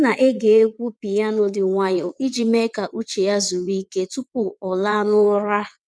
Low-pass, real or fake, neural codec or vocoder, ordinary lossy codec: none; fake; vocoder, 22.05 kHz, 80 mel bands, WaveNeXt; none